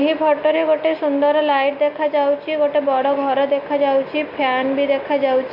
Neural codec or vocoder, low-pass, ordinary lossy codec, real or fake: none; 5.4 kHz; MP3, 48 kbps; real